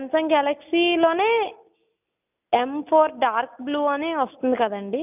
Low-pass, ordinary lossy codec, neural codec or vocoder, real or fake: 3.6 kHz; none; none; real